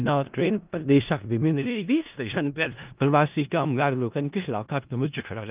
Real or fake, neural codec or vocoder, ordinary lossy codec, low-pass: fake; codec, 16 kHz in and 24 kHz out, 0.4 kbps, LongCat-Audio-Codec, four codebook decoder; Opus, 32 kbps; 3.6 kHz